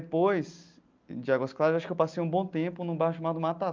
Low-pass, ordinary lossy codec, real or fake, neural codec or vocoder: 7.2 kHz; Opus, 24 kbps; real; none